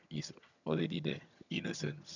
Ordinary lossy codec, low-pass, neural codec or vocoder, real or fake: none; 7.2 kHz; vocoder, 22.05 kHz, 80 mel bands, HiFi-GAN; fake